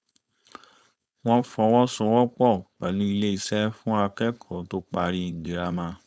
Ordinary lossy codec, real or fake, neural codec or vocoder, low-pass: none; fake; codec, 16 kHz, 4.8 kbps, FACodec; none